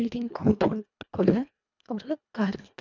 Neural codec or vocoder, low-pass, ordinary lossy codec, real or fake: codec, 24 kHz, 1.5 kbps, HILCodec; 7.2 kHz; none; fake